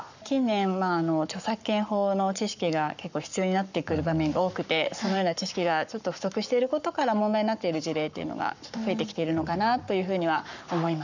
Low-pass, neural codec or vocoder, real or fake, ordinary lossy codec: 7.2 kHz; codec, 44.1 kHz, 7.8 kbps, Pupu-Codec; fake; none